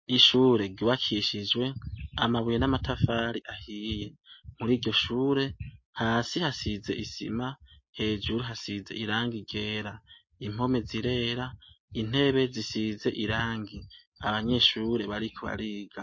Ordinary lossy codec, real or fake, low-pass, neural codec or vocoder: MP3, 32 kbps; real; 7.2 kHz; none